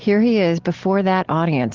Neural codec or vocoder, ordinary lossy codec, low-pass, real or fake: none; Opus, 16 kbps; 7.2 kHz; real